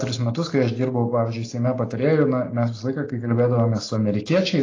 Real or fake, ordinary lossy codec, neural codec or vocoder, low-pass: real; AAC, 32 kbps; none; 7.2 kHz